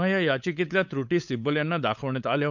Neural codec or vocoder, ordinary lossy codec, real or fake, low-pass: codec, 16 kHz, 8 kbps, FunCodec, trained on LibriTTS, 25 frames a second; none; fake; 7.2 kHz